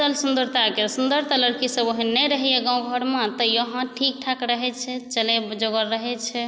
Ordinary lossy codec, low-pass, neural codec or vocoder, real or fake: none; none; none; real